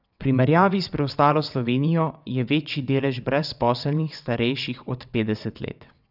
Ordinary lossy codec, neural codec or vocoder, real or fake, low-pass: none; vocoder, 22.05 kHz, 80 mel bands, WaveNeXt; fake; 5.4 kHz